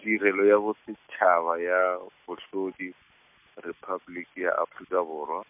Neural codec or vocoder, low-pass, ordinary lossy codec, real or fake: none; 3.6 kHz; MP3, 32 kbps; real